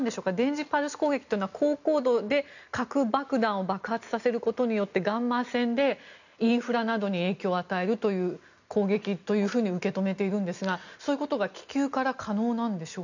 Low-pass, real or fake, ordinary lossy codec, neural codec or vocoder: 7.2 kHz; real; none; none